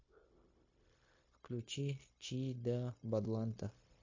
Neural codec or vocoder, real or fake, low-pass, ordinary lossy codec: codec, 16 kHz, 0.9 kbps, LongCat-Audio-Codec; fake; 7.2 kHz; MP3, 32 kbps